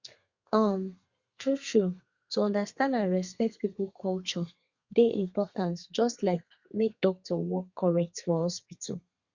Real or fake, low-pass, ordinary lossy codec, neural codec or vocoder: fake; 7.2 kHz; Opus, 64 kbps; codec, 32 kHz, 1.9 kbps, SNAC